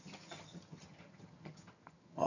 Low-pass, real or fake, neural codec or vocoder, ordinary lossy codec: 7.2 kHz; fake; vocoder, 22.05 kHz, 80 mel bands, HiFi-GAN; none